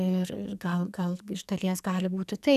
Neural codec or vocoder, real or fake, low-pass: codec, 44.1 kHz, 2.6 kbps, SNAC; fake; 14.4 kHz